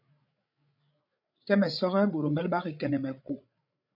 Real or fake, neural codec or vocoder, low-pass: fake; codec, 16 kHz, 8 kbps, FreqCodec, larger model; 5.4 kHz